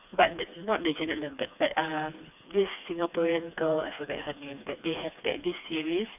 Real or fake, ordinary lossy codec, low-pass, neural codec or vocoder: fake; none; 3.6 kHz; codec, 16 kHz, 2 kbps, FreqCodec, smaller model